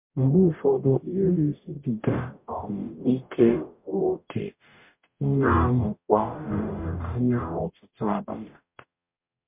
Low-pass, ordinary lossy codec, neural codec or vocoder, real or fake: 3.6 kHz; MP3, 32 kbps; codec, 44.1 kHz, 0.9 kbps, DAC; fake